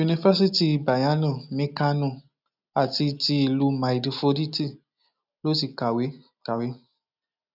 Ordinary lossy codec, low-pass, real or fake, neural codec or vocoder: none; 5.4 kHz; real; none